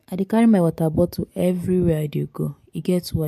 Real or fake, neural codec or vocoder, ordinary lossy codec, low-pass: real; none; MP3, 64 kbps; 14.4 kHz